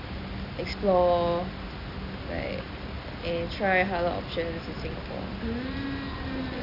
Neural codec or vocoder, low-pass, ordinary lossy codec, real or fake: none; 5.4 kHz; none; real